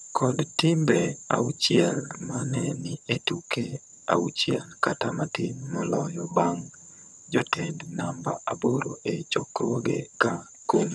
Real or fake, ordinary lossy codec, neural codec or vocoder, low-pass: fake; none; vocoder, 22.05 kHz, 80 mel bands, HiFi-GAN; none